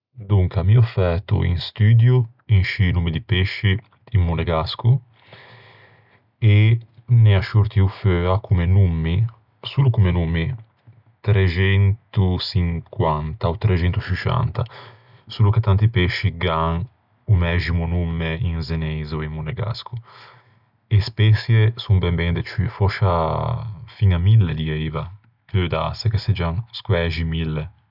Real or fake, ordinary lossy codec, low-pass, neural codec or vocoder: real; none; 5.4 kHz; none